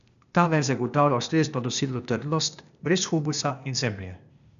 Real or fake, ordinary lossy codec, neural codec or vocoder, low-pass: fake; none; codec, 16 kHz, 0.8 kbps, ZipCodec; 7.2 kHz